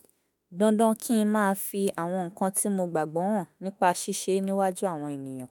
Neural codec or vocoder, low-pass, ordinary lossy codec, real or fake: autoencoder, 48 kHz, 32 numbers a frame, DAC-VAE, trained on Japanese speech; 19.8 kHz; none; fake